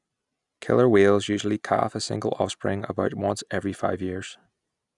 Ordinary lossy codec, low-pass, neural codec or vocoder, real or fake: none; 10.8 kHz; none; real